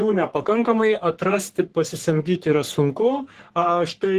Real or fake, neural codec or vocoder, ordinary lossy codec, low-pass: fake; codec, 44.1 kHz, 3.4 kbps, Pupu-Codec; Opus, 16 kbps; 14.4 kHz